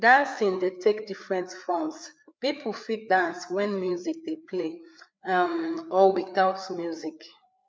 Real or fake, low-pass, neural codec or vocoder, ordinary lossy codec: fake; none; codec, 16 kHz, 4 kbps, FreqCodec, larger model; none